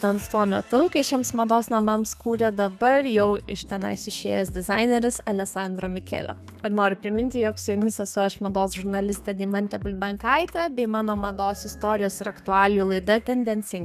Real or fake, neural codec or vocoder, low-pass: fake; codec, 32 kHz, 1.9 kbps, SNAC; 14.4 kHz